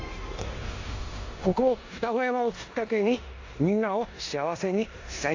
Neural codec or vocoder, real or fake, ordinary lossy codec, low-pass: codec, 16 kHz in and 24 kHz out, 0.9 kbps, LongCat-Audio-Codec, four codebook decoder; fake; AAC, 48 kbps; 7.2 kHz